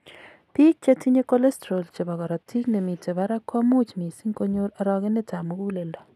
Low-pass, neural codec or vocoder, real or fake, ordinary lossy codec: 14.4 kHz; none; real; none